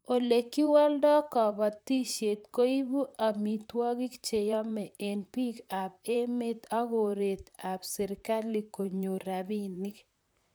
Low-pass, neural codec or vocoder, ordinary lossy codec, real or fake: none; vocoder, 44.1 kHz, 128 mel bands, Pupu-Vocoder; none; fake